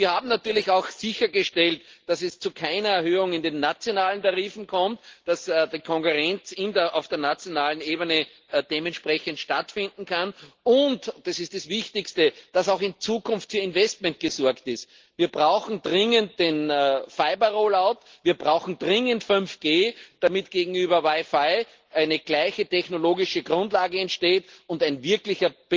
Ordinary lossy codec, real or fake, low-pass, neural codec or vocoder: Opus, 16 kbps; real; 7.2 kHz; none